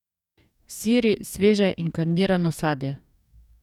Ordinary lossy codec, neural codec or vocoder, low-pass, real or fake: none; codec, 44.1 kHz, 2.6 kbps, DAC; 19.8 kHz; fake